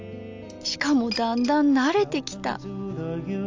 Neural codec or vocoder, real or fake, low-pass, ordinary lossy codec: none; real; 7.2 kHz; AAC, 48 kbps